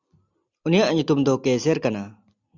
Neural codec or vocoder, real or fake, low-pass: none; real; 7.2 kHz